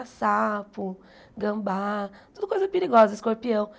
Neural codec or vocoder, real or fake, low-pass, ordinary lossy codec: none; real; none; none